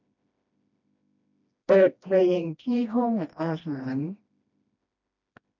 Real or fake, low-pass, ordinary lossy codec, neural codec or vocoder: fake; 7.2 kHz; none; codec, 16 kHz, 1 kbps, FreqCodec, smaller model